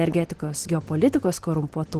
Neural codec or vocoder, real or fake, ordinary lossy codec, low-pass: none; real; Opus, 16 kbps; 14.4 kHz